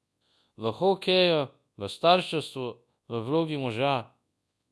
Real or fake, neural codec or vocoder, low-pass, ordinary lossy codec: fake; codec, 24 kHz, 0.9 kbps, WavTokenizer, large speech release; none; none